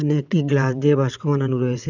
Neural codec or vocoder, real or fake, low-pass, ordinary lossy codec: vocoder, 22.05 kHz, 80 mel bands, WaveNeXt; fake; 7.2 kHz; none